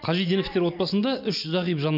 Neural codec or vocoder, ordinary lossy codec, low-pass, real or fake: none; none; 5.4 kHz; real